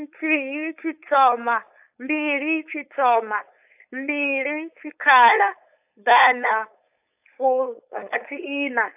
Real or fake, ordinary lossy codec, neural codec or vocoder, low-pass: fake; AAC, 32 kbps; codec, 16 kHz, 4.8 kbps, FACodec; 3.6 kHz